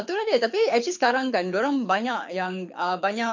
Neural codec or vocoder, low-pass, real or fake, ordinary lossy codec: codec, 24 kHz, 6 kbps, HILCodec; 7.2 kHz; fake; MP3, 48 kbps